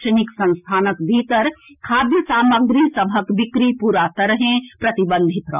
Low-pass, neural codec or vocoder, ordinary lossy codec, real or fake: 3.6 kHz; none; none; real